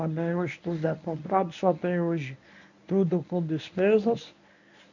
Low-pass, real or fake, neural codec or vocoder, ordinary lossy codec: 7.2 kHz; fake; codec, 24 kHz, 0.9 kbps, WavTokenizer, medium speech release version 1; none